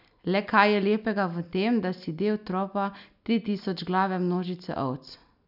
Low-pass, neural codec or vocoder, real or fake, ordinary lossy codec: 5.4 kHz; none; real; none